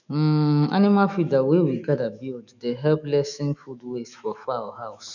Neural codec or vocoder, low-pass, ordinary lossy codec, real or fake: autoencoder, 48 kHz, 128 numbers a frame, DAC-VAE, trained on Japanese speech; 7.2 kHz; none; fake